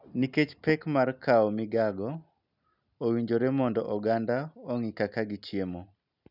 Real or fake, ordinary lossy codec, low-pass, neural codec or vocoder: real; none; 5.4 kHz; none